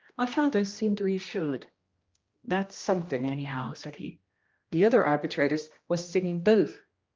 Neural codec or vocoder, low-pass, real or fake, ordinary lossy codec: codec, 16 kHz, 1 kbps, X-Codec, HuBERT features, trained on general audio; 7.2 kHz; fake; Opus, 24 kbps